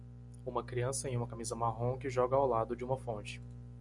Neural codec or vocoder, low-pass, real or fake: none; 10.8 kHz; real